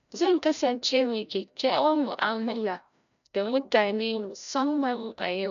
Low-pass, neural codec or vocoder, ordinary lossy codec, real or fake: 7.2 kHz; codec, 16 kHz, 0.5 kbps, FreqCodec, larger model; none; fake